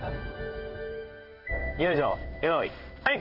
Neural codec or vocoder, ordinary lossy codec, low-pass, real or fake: codec, 16 kHz in and 24 kHz out, 1 kbps, XY-Tokenizer; none; 5.4 kHz; fake